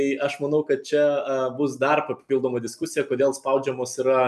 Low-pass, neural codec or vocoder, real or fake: 14.4 kHz; none; real